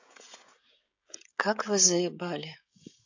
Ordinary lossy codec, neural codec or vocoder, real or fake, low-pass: AAC, 48 kbps; codec, 16 kHz, 16 kbps, FreqCodec, smaller model; fake; 7.2 kHz